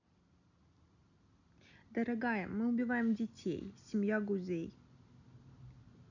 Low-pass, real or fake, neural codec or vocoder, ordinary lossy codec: 7.2 kHz; real; none; none